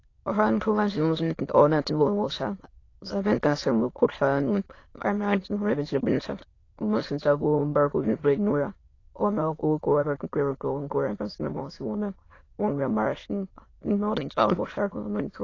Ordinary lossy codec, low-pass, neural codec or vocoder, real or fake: AAC, 32 kbps; 7.2 kHz; autoencoder, 22.05 kHz, a latent of 192 numbers a frame, VITS, trained on many speakers; fake